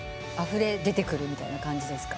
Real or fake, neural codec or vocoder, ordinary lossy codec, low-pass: real; none; none; none